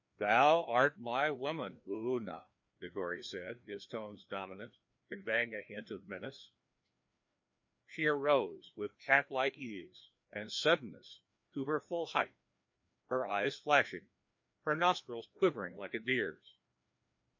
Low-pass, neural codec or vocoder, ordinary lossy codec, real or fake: 7.2 kHz; codec, 16 kHz, 2 kbps, FreqCodec, larger model; MP3, 48 kbps; fake